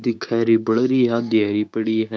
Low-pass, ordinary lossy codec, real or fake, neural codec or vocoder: none; none; fake; codec, 16 kHz, 6 kbps, DAC